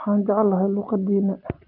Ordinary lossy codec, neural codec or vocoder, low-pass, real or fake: Opus, 32 kbps; none; 5.4 kHz; real